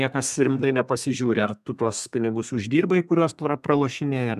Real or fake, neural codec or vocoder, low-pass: fake; codec, 32 kHz, 1.9 kbps, SNAC; 14.4 kHz